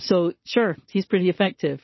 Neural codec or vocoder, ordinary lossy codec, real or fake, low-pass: none; MP3, 24 kbps; real; 7.2 kHz